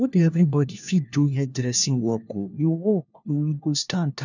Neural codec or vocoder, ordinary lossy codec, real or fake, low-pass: codec, 16 kHz, 1 kbps, FunCodec, trained on LibriTTS, 50 frames a second; none; fake; 7.2 kHz